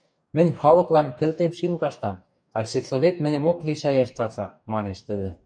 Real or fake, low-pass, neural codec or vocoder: fake; 9.9 kHz; codec, 44.1 kHz, 2.6 kbps, DAC